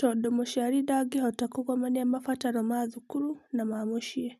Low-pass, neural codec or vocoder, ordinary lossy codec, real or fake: 14.4 kHz; none; none; real